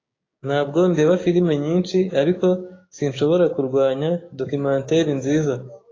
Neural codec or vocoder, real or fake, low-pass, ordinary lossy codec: codec, 16 kHz, 6 kbps, DAC; fake; 7.2 kHz; AAC, 32 kbps